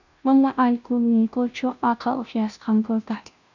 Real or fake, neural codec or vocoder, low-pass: fake; codec, 16 kHz, 0.5 kbps, FunCodec, trained on Chinese and English, 25 frames a second; 7.2 kHz